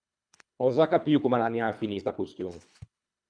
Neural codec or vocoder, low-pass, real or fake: codec, 24 kHz, 3 kbps, HILCodec; 9.9 kHz; fake